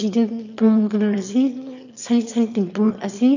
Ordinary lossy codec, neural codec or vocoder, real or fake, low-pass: none; autoencoder, 22.05 kHz, a latent of 192 numbers a frame, VITS, trained on one speaker; fake; 7.2 kHz